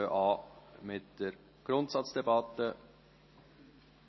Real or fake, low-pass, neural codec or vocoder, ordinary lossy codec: real; 7.2 kHz; none; MP3, 24 kbps